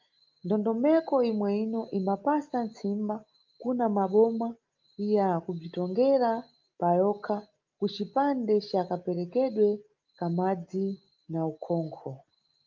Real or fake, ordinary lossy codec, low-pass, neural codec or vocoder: real; Opus, 24 kbps; 7.2 kHz; none